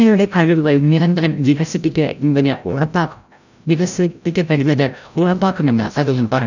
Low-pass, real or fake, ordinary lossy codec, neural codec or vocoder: 7.2 kHz; fake; none; codec, 16 kHz, 0.5 kbps, FreqCodec, larger model